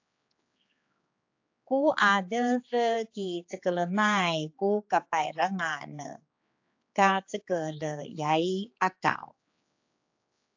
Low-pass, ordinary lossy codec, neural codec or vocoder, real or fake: 7.2 kHz; AAC, 48 kbps; codec, 16 kHz, 4 kbps, X-Codec, HuBERT features, trained on general audio; fake